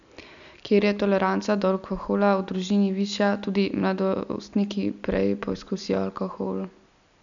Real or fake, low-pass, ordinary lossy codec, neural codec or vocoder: real; 7.2 kHz; none; none